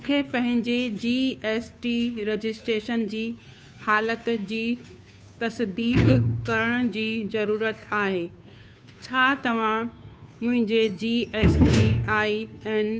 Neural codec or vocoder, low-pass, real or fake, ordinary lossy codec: codec, 16 kHz, 2 kbps, FunCodec, trained on Chinese and English, 25 frames a second; none; fake; none